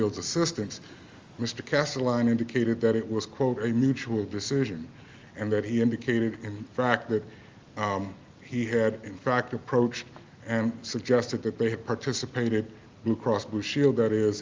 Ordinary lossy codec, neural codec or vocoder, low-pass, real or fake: Opus, 32 kbps; none; 7.2 kHz; real